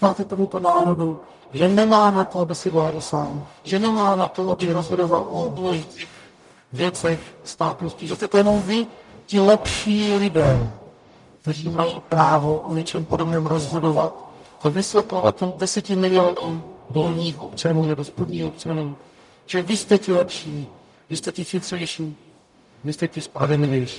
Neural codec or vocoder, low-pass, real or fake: codec, 44.1 kHz, 0.9 kbps, DAC; 10.8 kHz; fake